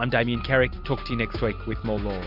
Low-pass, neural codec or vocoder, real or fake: 5.4 kHz; none; real